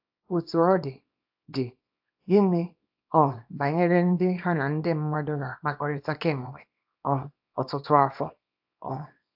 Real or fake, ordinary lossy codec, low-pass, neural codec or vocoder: fake; AAC, 48 kbps; 5.4 kHz; codec, 24 kHz, 0.9 kbps, WavTokenizer, small release